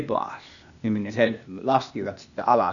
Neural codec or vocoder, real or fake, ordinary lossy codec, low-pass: codec, 16 kHz, 0.8 kbps, ZipCodec; fake; none; 7.2 kHz